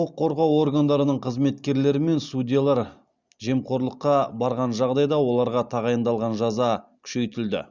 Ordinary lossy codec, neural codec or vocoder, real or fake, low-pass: Opus, 64 kbps; none; real; 7.2 kHz